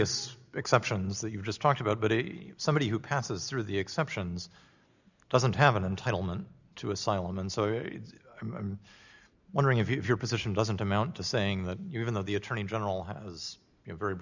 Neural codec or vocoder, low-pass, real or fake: none; 7.2 kHz; real